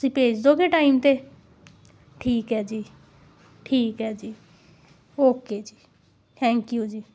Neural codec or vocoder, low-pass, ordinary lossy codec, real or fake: none; none; none; real